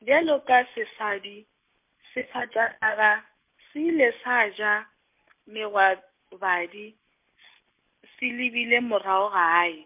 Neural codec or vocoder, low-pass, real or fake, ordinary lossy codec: none; 3.6 kHz; real; MP3, 32 kbps